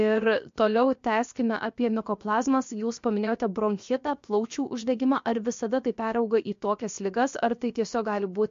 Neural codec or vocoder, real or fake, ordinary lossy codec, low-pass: codec, 16 kHz, 0.7 kbps, FocalCodec; fake; MP3, 48 kbps; 7.2 kHz